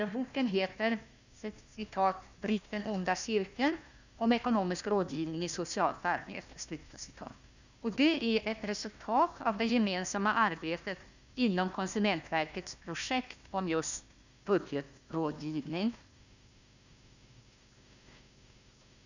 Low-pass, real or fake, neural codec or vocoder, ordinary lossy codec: 7.2 kHz; fake; codec, 16 kHz, 1 kbps, FunCodec, trained on Chinese and English, 50 frames a second; none